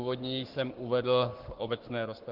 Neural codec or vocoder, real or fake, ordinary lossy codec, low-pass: codec, 44.1 kHz, 7.8 kbps, Pupu-Codec; fake; Opus, 16 kbps; 5.4 kHz